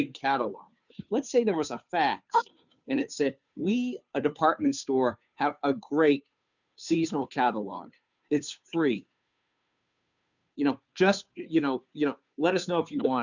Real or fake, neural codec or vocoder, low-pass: fake; codec, 16 kHz, 2 kbps, FunCodec, trained on Chinese and English, 25 frames a second; 7.2 kHz